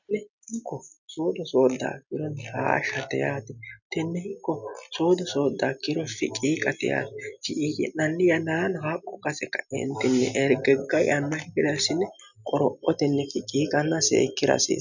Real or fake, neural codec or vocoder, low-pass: real; none; 7.2 kHz